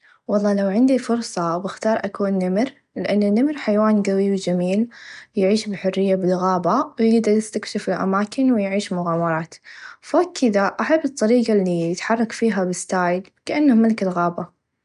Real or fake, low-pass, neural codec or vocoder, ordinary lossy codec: real; 10.8 kHz; none; none